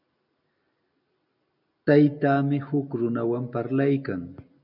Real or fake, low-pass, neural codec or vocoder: real; 5.4 kHz; none